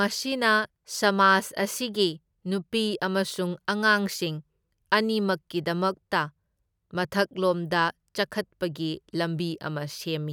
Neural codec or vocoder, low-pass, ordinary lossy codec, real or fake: none; none; none; real